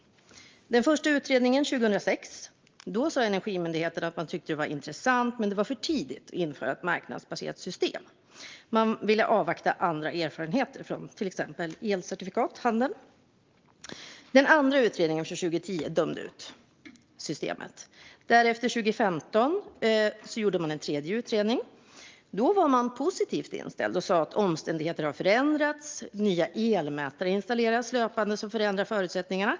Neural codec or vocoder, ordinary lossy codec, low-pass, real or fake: none; Opus, 32 kbps; 7.2 kHz; real